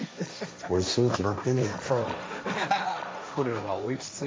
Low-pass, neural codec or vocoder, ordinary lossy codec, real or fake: none; codec, 16 kHz, 1.1 kbps, Voila-Tokenizer; none; fake